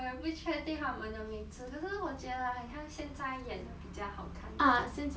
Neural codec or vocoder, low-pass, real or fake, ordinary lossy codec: none; none; real; none